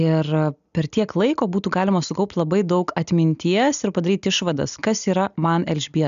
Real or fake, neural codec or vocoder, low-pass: real; none; 7.2 kHz